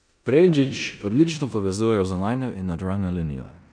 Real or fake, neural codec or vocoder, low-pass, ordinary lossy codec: fake; codec, 16 kHz in and 24 kHz out, 0.9 kbps, LongCat-Audio-Codec, four codebook decoder; 9.9 kHz; none